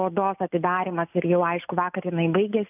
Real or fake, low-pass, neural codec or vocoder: real; 3.6 kHz; none